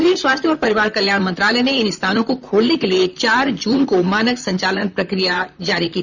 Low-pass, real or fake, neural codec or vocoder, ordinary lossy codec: 7.2 kHz; fake; vocoder, 44.1 kHz, 128 mel bands, Pupu-Vocoder; none